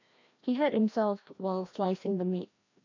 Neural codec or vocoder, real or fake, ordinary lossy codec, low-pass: codec, 16 kHz, 1 kbps, FreqCodec, larger model; fake; AAC, 48 kbps; 7.2 kHz